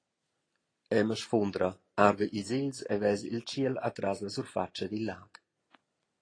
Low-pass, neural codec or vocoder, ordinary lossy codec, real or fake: 9.9 kHz; none; AAC, 32 kbps; real